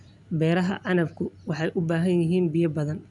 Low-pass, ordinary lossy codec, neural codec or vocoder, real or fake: 10.8 kHz; none; none; real